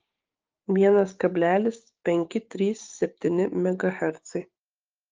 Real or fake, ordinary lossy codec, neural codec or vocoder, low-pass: fake; Opus, 24 kbps; codec, 16 kHz, 6 kbps, DAC; 7.2 kHz